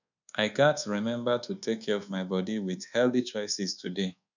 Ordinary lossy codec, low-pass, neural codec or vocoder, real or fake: none; 7.2 kHz; codec, 24 kHz, 1.2 kbps, DualCodec; fake